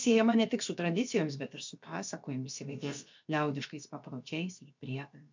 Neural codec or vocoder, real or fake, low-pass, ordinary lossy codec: codec, 16 kHz, about 1 kbps, DyCAST, with the encoder's durations; fake; 7.2 kHz; MP3, 64 kbps